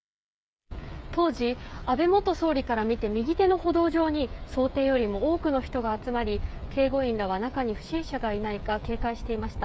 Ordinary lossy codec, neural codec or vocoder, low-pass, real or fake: none; codec, 16 kHz, 8 kbps, FreqCodec, smaller model; none; fake